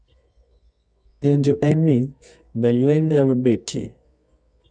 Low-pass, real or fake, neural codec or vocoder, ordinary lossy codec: 9.9 kHz; fake; codec, 24 kHz, 0.9 kbps, WavTokenizer, medium music audio release; none